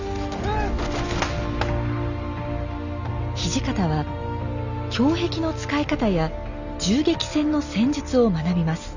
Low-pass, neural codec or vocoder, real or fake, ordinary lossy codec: 7.2 kHz; none; real; none